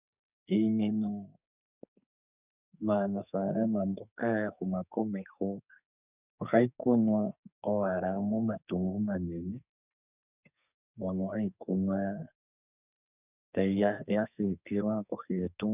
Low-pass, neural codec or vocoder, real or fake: 3.6 kHz; codec, 44.1 kHz, 2.6 kbps, SNAC; fake